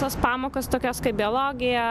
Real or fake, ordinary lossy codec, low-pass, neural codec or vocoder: real; AAC, 96 kbps; 14.4 kHz; none